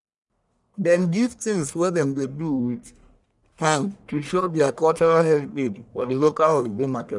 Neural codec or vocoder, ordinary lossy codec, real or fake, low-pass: codec, 44.1 kHz, 1.7 kbps, Pupu-Codec; none; fake; 10.8 kHz